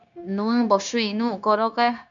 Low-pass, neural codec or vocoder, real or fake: 7.2 kHz; codec, 16 kHz, 0.9 kbps, LongCat-Audio-Codec; fake